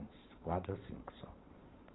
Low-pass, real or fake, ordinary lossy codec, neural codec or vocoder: 7.2 kHz; fake; AAC, 16 kbps; vocoder, 22.05 kHz, 80 mel bands, Vocos